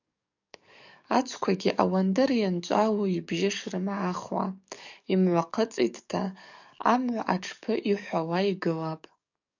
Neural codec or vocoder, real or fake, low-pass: codec, 44.1 kHz, 7.8 kbps, DAC; fake; 7.2 kHz